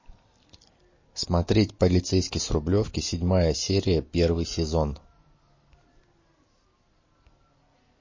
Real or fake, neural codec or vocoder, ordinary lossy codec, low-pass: real; none; MP3, 32 kbps; 7.2 kHz